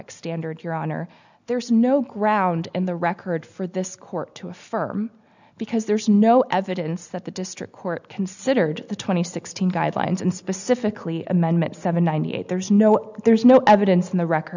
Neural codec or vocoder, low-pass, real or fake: none; 7.2 kHz; real